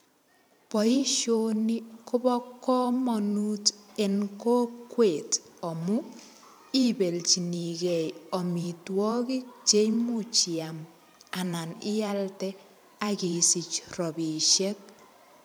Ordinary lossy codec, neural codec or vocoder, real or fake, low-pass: none; vocoder, 44.1 kHz, 128 mel bands every 512 samples, BigVGAN v2; fake; none